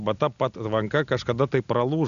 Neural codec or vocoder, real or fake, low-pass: none; real; 7.2 kHz